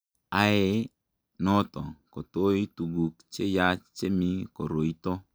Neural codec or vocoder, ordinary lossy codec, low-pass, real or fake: none; none; none; real